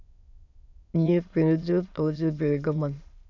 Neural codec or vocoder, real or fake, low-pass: autoencoder, 22.05 kHz, a latent of 192 numbers a frame, VITS, trained on many speakers; fake; 7.2 kHz